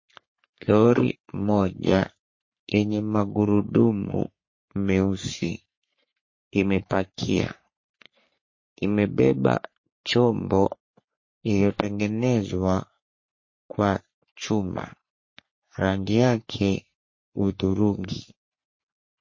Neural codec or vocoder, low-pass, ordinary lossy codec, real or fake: codec, 44.1 kHz, 3.4 kbps, Pupu-Codec; 7.2 kHz; MP3, 32 kbps; fake